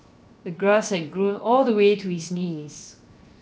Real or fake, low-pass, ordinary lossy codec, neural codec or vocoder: fake; none; none; codec, 16 kHz, 0.7 kbps, FocalCodec